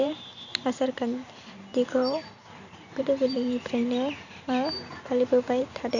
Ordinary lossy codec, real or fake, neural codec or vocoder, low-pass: none; fake; vocoder, 44.1 kHz, 128 mel bands every 512 samples, BigVGAN v2; 7.2 kHz